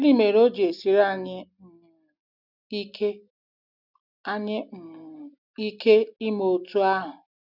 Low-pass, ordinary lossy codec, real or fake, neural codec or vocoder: 5.4 kHz; none; real; none